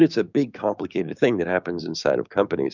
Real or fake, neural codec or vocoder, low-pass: fake; codec, 16 kHz, 6 kbps, DAC; 7.2 kHz